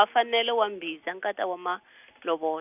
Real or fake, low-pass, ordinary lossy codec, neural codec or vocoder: real; 3.6 kHz; none; none